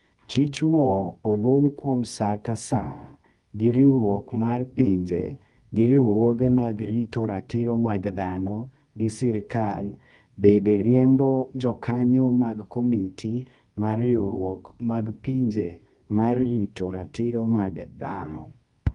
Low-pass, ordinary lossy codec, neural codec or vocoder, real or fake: 10.8 kHz; Opus, 32 kbps; codec, 24 kHz, 0.9 kbps, WavTokenizer, medium music audio release; fake